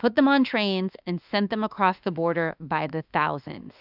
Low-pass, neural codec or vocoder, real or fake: 5.4 kHz; autoencoder, 48 kHz, 32 numbers a frame, DAC-VAE, trained on Japanese speech; fake